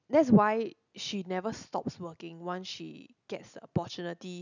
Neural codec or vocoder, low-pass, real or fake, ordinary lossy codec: none; 7.2 kHz; real; none